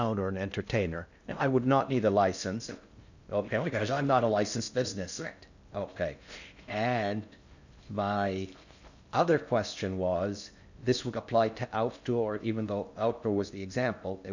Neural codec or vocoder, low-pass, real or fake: codec, 16 kHz in and 24 kHz out, 0.6 kbps, FocalCodec, streaming, 2048 codes; 7.2 kHz; fake